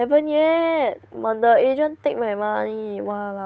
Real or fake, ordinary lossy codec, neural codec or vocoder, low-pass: fake; none; codec, 16 kHz, 8 kbps, FunCodec, trained on Chinese and English, 25 frames a second; none